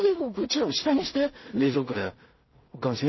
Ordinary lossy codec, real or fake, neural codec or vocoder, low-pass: MP3, 24 kbps; fake; codec, 16 kHz in and 24 kHz out, 0.4 kbps, LongCat-Audio-Codec, two codebook decoder; 7.2 kHz